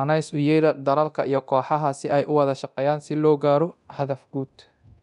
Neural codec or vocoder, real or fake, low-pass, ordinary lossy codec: codec, 24 kHz, 0.9 kbps, DualCodec; fake; 10.8 kHz; none